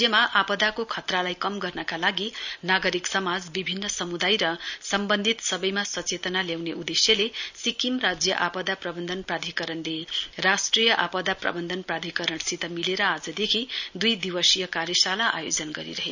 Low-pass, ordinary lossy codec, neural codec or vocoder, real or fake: 7.2 kHz; none; none; real